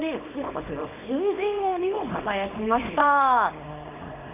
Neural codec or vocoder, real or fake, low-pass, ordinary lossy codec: codec, 24 kHz, 0.9 kbps, WavTokenizer, small release; fake; 3.6 kHz; none